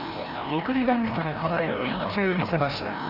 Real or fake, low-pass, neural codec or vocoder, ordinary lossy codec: fake; 5.4 kHz; codec, 16 kHz, 1 kbps, FreqCodec, larger model; none